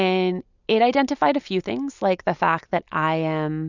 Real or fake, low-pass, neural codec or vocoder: real; 7.2 kHz; none